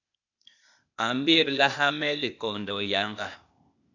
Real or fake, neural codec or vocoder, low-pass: fake; codec, 16 kHz, 0.8 kbps, ZipCodec; 7.2 kHz